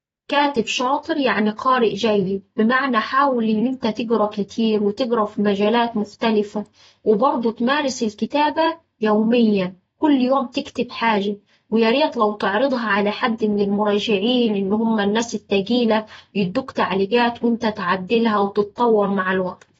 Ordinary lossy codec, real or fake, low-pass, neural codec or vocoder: AAC, 24 kbps; fake; 19.8 kHz; vocoder, 44.1 kHz, 128 mel bands every 256 samples, BigVGAN v2